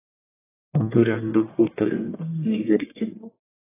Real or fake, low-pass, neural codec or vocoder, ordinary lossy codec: fake; 3.6 kHz; codec, 44.1 kHz, 1.7 kbps, Pupu-Codec; AAC, 16 kbps